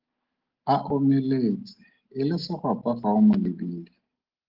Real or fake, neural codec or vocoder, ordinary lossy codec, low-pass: real; none; Opus, 16 kbps; 5.4 kHz